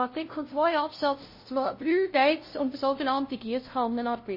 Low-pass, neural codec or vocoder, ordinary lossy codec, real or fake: 5.4 kHz; codec, 16 kHz, 0.5 kbps, FunCodec, trained on LibriTTS, 25 frames a second; MP3, 24 kbps; fake